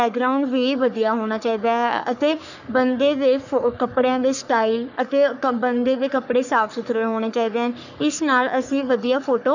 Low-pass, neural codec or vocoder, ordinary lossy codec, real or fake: 7.2 kHz; codec, 44.1 kHz, 3.4 kbps, Pupu-Codec; none; fake